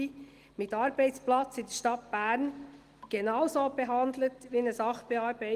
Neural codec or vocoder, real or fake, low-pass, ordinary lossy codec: none; real; 14.4 kHz; Opus, 24 kbps